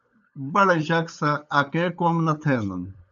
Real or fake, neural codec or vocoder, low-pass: fake; codec, 16 kHz, 8 kbps, FunCodec, trained on LibriTTS, 25 frames a second; 7.2 kHz